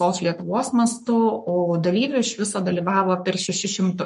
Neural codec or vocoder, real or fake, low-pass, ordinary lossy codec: codec, 44.1 kHz, 7.8 kbps, Pupu-Codec; fake; 14.4 kHz; MP3, 48 kbps